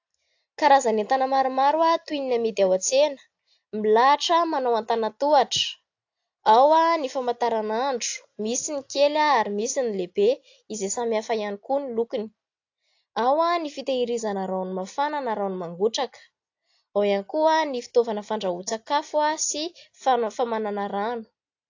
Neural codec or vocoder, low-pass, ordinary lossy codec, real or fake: none; 7.2 kHz; AAC, 48 kbps; real